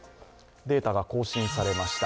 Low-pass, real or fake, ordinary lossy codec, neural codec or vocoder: none; real; none; none